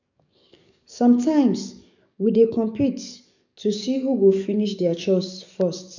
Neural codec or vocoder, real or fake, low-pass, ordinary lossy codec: codec, 44.1 kHz, 7.8 kbps, DAC; fake; 7.2 kHz; none